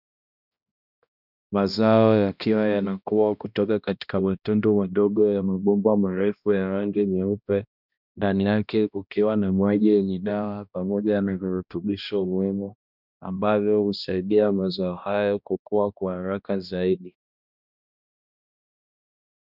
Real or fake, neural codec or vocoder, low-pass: fake; codec, 16 kHz, 1 kbps, X-Codec, HuBERT features, trained on balanced general audio; 5.4 kHz